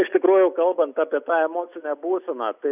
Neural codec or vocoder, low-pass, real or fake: none; 3.6 kHz; real